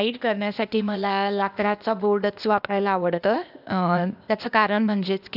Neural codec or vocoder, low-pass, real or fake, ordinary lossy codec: codec, 16 kHz, 0.8 kbps, ZipCodec; 5.4 kHz; fake; none